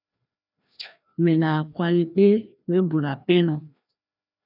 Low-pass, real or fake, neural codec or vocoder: 5.4 kHz; fake; codec, 16 kHz, 1 kbps, FreqCodec, larger model